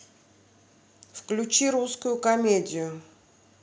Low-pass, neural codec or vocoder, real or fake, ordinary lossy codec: none; none; real; none